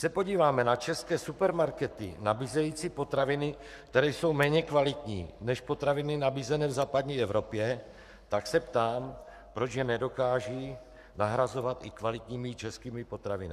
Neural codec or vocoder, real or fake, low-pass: codec, 44.1 kHz, 7.8 kbps, Pupu-Codec; fake; 14.4 kHz